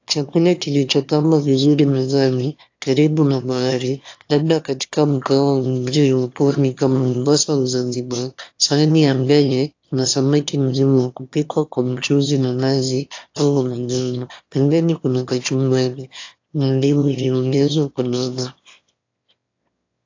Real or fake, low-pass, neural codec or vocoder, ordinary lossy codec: fake; 7.2 kHz; autoencoder, 22.05 kHz, a latent of 192 numbers a frame, VITS, trained on one speaker; AAC, 48 kbps